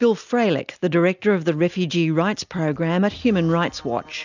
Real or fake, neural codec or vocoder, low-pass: real; none; 7.2 kHz